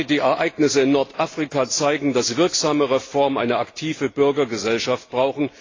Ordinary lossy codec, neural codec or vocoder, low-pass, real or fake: AAC, 32 kbps; none; 7.2 kHz; real